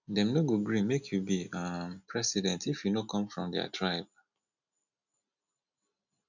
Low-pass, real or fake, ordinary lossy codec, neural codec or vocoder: 7.2 kHz; real; none; none